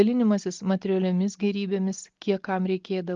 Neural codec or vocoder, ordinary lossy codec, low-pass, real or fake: none; Opus, 32 kbps; 7.2 kHz; real